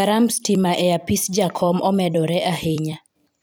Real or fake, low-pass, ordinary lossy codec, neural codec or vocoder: fake; none; none; vocoder, 44.1 kHz, 128 mel bands every 256 samples, BigVGAN v2